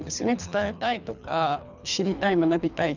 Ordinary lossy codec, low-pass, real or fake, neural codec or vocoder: none; 7.2 kHz; fake; codec, 24 kHz, 3 kbps, HILCodec